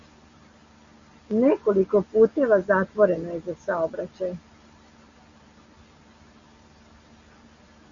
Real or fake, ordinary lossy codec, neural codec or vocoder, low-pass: real; Opus, 64 kbps; none; 7.2 kHz